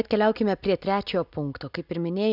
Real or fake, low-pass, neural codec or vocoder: real; 5.4 kHz; none